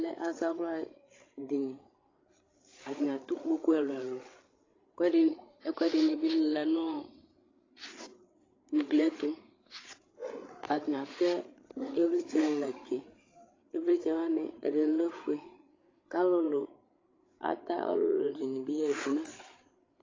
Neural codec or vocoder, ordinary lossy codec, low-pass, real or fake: codec, 16 kHz, 8 kbps, FreqCodec, larger model; AAC, 32 kbps; 7.2 kHz; fake